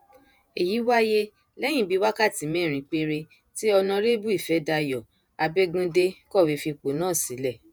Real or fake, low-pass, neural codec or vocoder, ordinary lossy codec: fake; none; vocoder, 48 kHz, 128 mel bands, Vocos; none